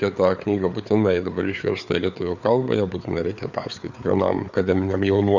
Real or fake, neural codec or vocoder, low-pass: fake; codec, 16 kHz, 4 kbps, FreqCodec, larger model; 7.2 kHz